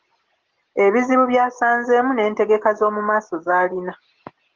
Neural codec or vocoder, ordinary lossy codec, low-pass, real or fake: none; Opus, 16 kbps; 7.2 kHz; real